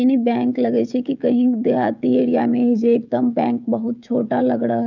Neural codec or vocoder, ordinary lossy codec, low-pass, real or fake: codec, 16 kHz, 16 kbps, FreqCodec, smaller model; none; 7.2 kHz; fake